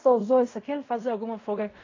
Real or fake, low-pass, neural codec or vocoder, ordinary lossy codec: fake; 7.2 kHz; codec, 16 kHz in and 24 kHz out, 0.4 kbps, LongCat-Audio-Codec, fine tuned four codebook decoder; AAC, 32 kbps